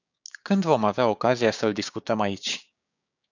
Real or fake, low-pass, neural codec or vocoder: fake; 7.2 kHz; codec, 16 kHz, 6 kbps, DAC